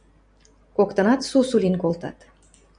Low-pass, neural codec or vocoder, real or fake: 9.9 kHz; none; real